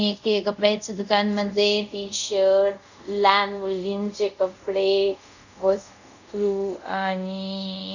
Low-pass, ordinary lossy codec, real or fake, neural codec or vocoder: 7.2 kHz; none; fake; codec, 24 kHz, 0.5 kbps, DualCodec